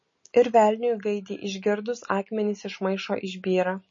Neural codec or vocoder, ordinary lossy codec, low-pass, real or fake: none; MP3, 32 kbps; 7.2 kHz; real